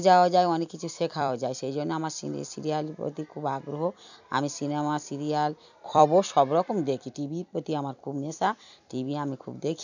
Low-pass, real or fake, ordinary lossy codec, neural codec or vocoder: 7.2 kHz; fake; none; vocoder, 44.1 kHz, 128 mel bands every 256 samples, BigVGAN v2